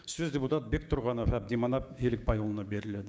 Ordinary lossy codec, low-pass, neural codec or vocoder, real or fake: none; none; codec, 16 kHz, 6 kbps, DAC; fake